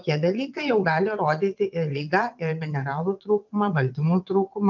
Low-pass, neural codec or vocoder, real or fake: 7.2 kHz; vocoder, 22.05 kHz, 80 mel bands, WaveNeXt; fake